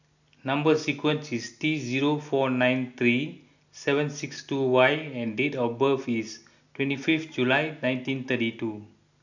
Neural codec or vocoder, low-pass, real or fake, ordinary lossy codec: none; 7.2 kHz; real; none